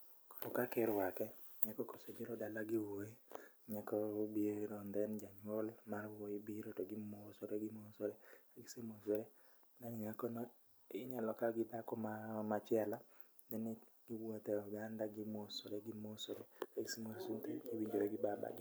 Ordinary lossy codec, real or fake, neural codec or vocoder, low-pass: none; real; none; none